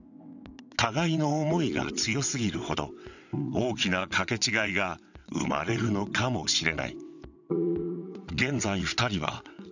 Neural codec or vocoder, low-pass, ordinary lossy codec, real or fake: vocoder, 22.05 kHz, 80 mel bands, WaveNeXt; 7.2 kHz; none; fake